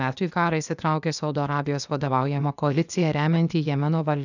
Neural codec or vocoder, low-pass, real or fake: codec, 16 kHz, 0.8 kbps, ZipCodec; 7.2 kHz; fake